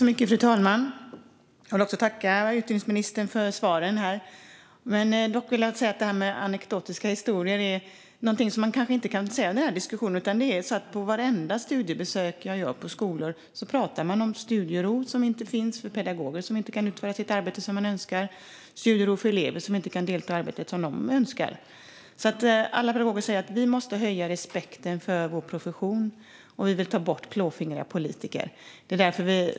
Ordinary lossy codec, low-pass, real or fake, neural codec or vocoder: none; none; real; none